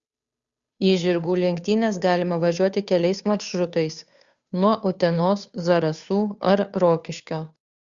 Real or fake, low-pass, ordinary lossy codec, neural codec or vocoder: fake; 7.2 kHz; Opus, 64 kbps; codec, 16 kHz, 2 kbps, FunCodec, trained on Chinese and English, 25 frames a second